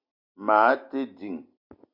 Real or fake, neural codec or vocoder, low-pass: real; none; 5.4 kHz